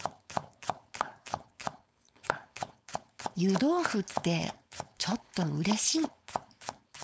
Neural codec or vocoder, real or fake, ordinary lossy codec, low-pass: codec, 16 kHz, 4.8 kbps, FACodec; fake; none; none